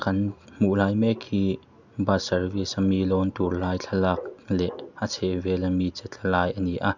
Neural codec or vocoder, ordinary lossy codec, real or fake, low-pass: none; none; real; 7.2 kHz